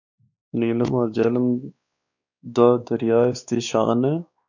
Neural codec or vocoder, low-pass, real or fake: codec, 16 kHz, 2 kbps, X-Codec, WavLM features, trained on Multilingual LibriSpeech; 7.2 kHz; fake